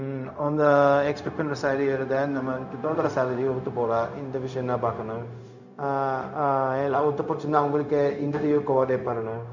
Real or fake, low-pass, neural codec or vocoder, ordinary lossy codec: fake; 7.2 kHz; codec, 16 kHz, 0.4 kbps, LongCat-Audio-Codec; none